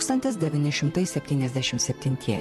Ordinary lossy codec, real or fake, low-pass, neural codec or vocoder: MP3, 64 kbps; fake; 14.4 kHz; vocoder, 44.1 kHz, 128 mel bands, Pupu-Vocoder